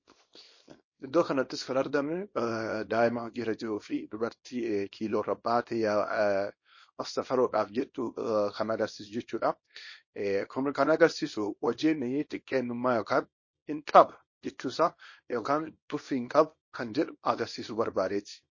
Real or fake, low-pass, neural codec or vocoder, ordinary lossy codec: fake; 7.2 kHz; codec, 24 kHz, 0.9 kbps, WavTokenizer, small release; MP3, 32 kbps